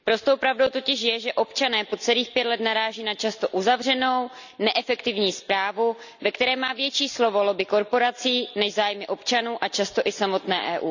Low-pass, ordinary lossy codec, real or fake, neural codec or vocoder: 7.2 kHz; none; real; none